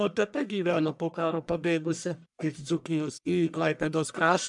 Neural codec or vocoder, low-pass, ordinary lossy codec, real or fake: codec, 44.1 kHz, 1.7 kbps, Pupu-Codec; 10.8 kHz; MP3, 96 kbps; fake